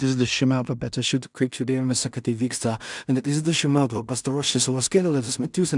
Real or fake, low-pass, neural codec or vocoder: fake; 10.8 kHz; codec, 16 kHz in and 24 kHz out, 0.4 kbps, LongCat-Audio-Codec, two codebook decoder